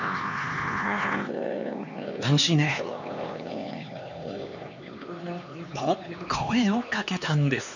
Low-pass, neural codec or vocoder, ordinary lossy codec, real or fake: 7.2 kHz; codec, 16 kHz, 2 kbps, X-Codec, HuBERT features, trained on LibriSpeech; none; fake